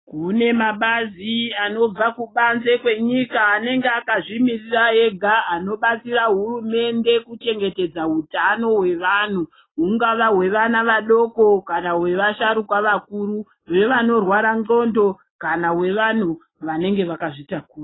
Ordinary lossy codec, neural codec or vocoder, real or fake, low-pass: AAC, 16 kbps; none; real; 7.2 kHz